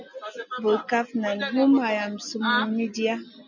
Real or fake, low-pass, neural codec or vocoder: real; 7.2 kHz; none